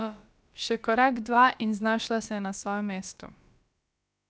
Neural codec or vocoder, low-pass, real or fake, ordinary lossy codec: codec, 16 kHz, about 1 kbps, DyCAST, with the encoder's durations; none; fake; none